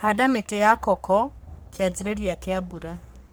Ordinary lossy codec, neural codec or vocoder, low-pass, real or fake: none; codec, 44.1 kHz, 3.4 kbps, Pupu-Codec; none; fake